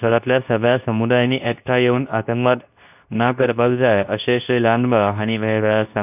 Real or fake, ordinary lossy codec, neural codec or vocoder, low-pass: fake; none; codec, 24 kHz, 0.9 kbps, WavTokenizer, medium speech release version 2; 3.6 kHz